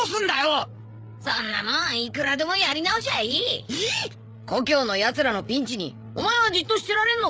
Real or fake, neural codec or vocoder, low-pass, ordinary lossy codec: fake; codec, 16 kHz, 8 kbps, FreqCodec, larger model; none; none